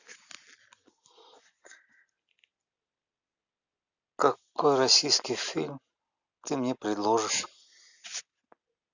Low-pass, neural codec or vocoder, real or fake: 7.2 kHz; none; real